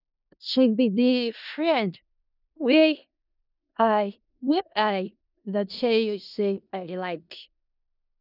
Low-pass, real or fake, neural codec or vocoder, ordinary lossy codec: 5.4 kHz; fake; codec, 16 kHz in and 24 kHz out, 0.4 kbps, LongCat-Audio-Codec, four codebook decoder; none